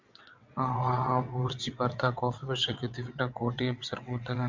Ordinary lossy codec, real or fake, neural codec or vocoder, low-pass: MP3, 64 kbps; fake; vocoder, 22.05 kHz, 80 mel bands, WaveNeXt; 7.2 kHz